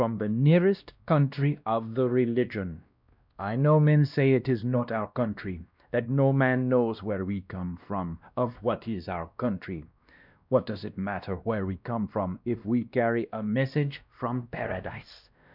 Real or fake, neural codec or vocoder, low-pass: fake; codec, 16 kHz, 1 kbps, X-Codec, WavLM features, trained on Multilingual LibriSpeech; 5.4 kHz